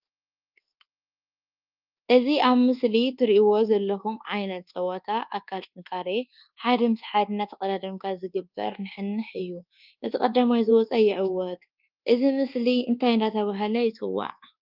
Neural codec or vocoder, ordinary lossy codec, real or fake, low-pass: codec, 24 kHz, 1.2 kbps, DualCodec; Opus, 24 kbps; fake; 5.4 kHz